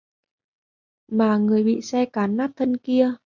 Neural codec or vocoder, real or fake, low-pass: none; real; 7.2 kHz